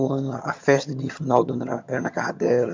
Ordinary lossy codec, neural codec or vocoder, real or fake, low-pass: MP3, 64 kbps; vocoder, 22.05 kHz, 80 mel bands, HiFi-GAN; fake; 7.2 kHz